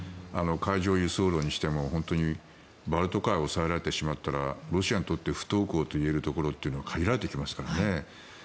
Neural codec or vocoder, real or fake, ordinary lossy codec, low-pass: none; real; none; none